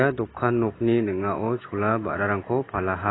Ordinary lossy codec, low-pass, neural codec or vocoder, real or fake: AAC, 16 kbps; 7.2 kHz; none; real